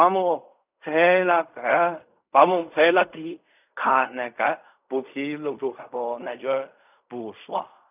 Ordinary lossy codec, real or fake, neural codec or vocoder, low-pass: none; fake; codec, 16 kHz in and 24 kHz out, 0.4 kbps, LongCat-Audio-Codec, fine tuned four codebook decoder; 3.6 kHz